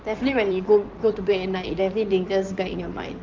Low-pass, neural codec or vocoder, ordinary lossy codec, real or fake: 7.2 kHz; codec, 16 kHz in and 24 kHz out, 2.2 kbps, FireRedTTS-2 codec; Opus, 32 kbps; fake